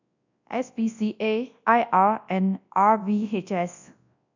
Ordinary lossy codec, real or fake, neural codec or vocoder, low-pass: none; fake; codec, 24 kHz, 0.9 kbps, WavTokenizer, large speech release; 7.2 kHz